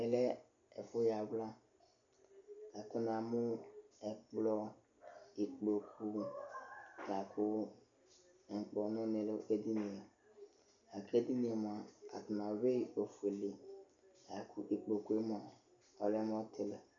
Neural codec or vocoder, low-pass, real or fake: none; 7.2 kHz; real